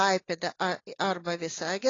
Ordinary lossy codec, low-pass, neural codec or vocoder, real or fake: AAC, 32 kbps; 7.2 kHz; none; real